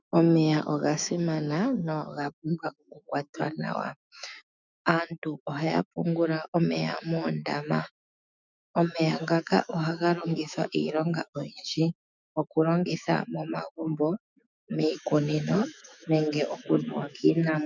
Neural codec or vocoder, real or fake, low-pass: autoencoder, 48 kHz, 128 numbers a frame, DAC-VAE, trained on Japanese speech; fake; 7.2 kHz